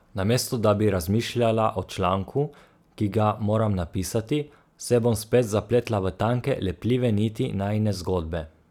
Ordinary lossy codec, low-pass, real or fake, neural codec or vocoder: none; 19.8 kHz; real; none